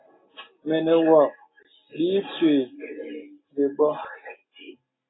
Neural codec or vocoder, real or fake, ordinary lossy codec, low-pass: none; real; AAC, 16 kbps; 7.2 kHz